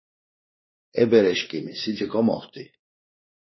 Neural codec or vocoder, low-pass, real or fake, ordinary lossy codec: codec, 16 kHz, 2 kbps, X-Codec, WavLM features, trained on Multilingual LibriSpeech; 7.2 kHz; fake; MP3, 24 kbps